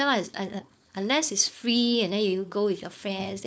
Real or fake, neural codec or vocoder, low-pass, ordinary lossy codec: fake; codec, 16 kHz, 4.8 kbps, FACodec; none; none